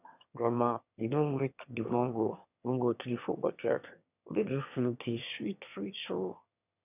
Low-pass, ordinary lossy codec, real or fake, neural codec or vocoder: 3.6 kHz; none; fake; autoencoder, 22.05 kHz, a latent of 192 numbers a frame, VITS, trained on one speaker